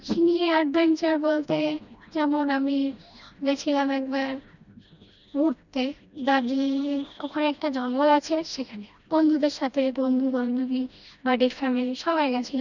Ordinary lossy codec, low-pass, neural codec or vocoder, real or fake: none; 7.2 kHz; codec, 16 kHz, 1 kbps, FreqCodec, smaller model; fake